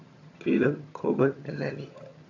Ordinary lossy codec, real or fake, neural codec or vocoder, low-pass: AAC, 48 kbps; fake; vocoder, 22.05 kHz, 80 mel bands, HiFi-GAN; 7.2 kHz